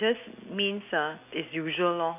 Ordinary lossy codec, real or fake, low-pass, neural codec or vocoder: none; real; 3.6 kHz; none